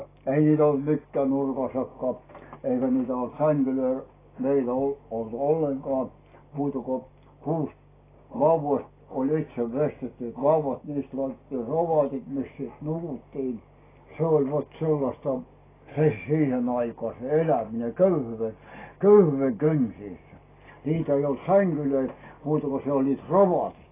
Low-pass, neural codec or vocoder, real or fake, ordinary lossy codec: 3.6 kHz; none; real; AAC, 16 kbps